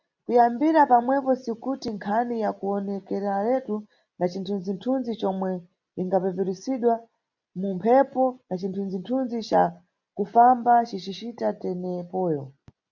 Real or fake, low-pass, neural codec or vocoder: real; 7.2 kHz; none